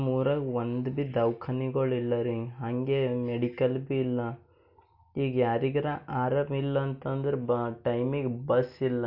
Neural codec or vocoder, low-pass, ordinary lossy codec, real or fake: none; 5.4 kHz; AAC, 48 kbps; real